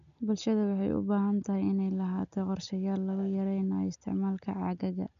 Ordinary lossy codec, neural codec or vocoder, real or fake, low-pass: none; none; real; 7.2 kHz